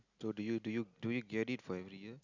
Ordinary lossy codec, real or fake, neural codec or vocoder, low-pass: none; real; none; 7.2 kHz